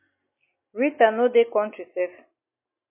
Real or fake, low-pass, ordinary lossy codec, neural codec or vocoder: real; 3.6 kHz; MP3, 24 kbps; none